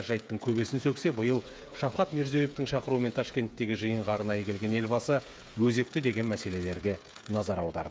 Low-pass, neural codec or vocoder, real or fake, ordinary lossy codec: none; codec, 16 kHz, 8 kbps, FreqCodec, smaller model; fake; none